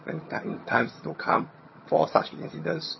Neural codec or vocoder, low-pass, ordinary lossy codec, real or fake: vocoder, 22.05 kHz, 80 mel bands, HiFi-GAN; 7.2 kHz; MP3, 24 kbps; fake